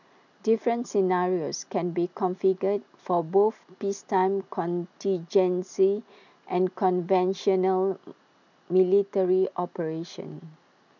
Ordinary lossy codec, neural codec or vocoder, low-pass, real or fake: none; none; 7.2 kHz; real